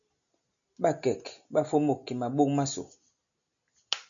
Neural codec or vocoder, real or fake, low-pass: none; real; 7.2 kHz